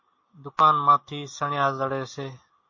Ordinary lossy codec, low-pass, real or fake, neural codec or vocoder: MP3, 32 kbps; 7.2 kHz; real; none